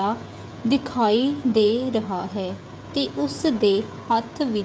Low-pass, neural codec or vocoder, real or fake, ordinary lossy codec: none; codec, 16 kHz, 16 kbps, FreqCodec, smaller model; fake; none